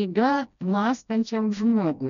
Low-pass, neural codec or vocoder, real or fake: 7.2 kHz; codec, 16 kHz, 1 kbps, FreqCodec, smaller model; fake